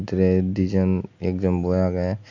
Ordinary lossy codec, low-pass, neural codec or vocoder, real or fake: AAC, 48 kbps; 7.2 kHz; none; real